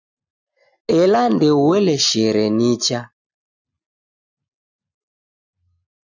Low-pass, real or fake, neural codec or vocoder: 7.2 kHz; real; none